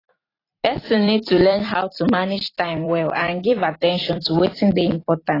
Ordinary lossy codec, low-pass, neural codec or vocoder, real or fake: AAC, 24 kbps; 5.4 kHz; vocoder, 44.1 kHz, 128 mel bands every 256 samples, BigVGAN v2; fake